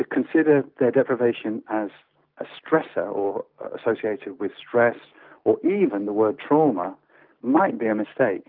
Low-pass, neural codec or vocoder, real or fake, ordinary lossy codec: 5.4 kHz; none; real; Opus, 24 kbps